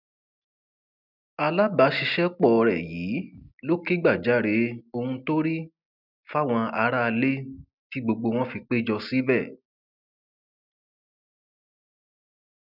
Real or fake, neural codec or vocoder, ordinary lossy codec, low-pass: real; none; none; 5.4 kHz